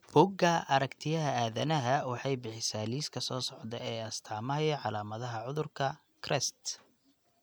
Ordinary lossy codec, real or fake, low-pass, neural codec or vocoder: none; real; none; none